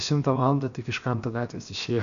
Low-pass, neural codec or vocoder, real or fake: 7.2 kHz; codec, 16 kHz, 0.7 kbps, FocalCodec; fake